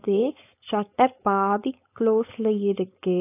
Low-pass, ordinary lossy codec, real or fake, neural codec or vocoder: 3.6 kHz; AAC, 24 kbps; fake; codec, 16 kHz, 4.8 kbps, FACodec